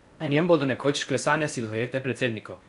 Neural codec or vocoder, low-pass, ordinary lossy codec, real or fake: codec, 16 kHz in and 24 kHz out, 0.6 kbps, FocalCodec, streaming, 4096 codes; 10.8 kHz; none; fake